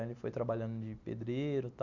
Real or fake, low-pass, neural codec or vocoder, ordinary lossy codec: real; 7.2 kHz; none; none